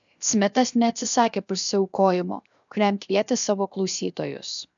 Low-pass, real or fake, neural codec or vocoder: 7.2 kHz; fake; codec, 16 kHz, 0.7 kbps, FocalCodec